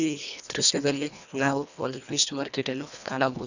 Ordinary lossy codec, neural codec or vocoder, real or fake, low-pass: none; codec, 24 kHz, 1.5 kbps, HILCodec; fake; 7.2 kHz